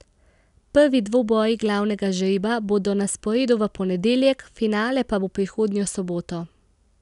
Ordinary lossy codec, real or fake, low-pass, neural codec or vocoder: none; real; 10.8 kHz; none